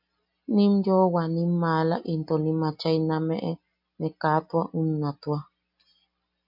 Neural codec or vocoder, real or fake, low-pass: none; real; 5.4 kHz